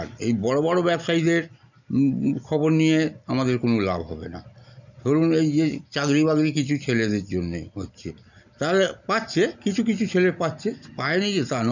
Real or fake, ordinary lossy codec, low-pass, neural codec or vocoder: real; none; 7.2 kHz; none